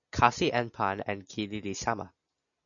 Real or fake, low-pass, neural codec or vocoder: real; 7.2 kHz; none